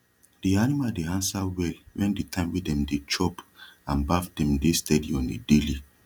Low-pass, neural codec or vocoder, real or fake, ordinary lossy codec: 19.8 kHz; none; real; none